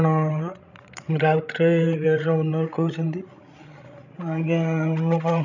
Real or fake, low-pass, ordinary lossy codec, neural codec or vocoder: fake; 7.2 kHz; none; codec, 16 kHz, 16 kbps, FreqCodec, larger model